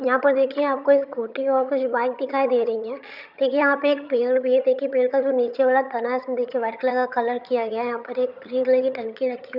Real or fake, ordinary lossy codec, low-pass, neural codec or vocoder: fake; none; 5.4 kHz; vocoder, 22.05 kHz, 80 mel bands, HiFi-GAN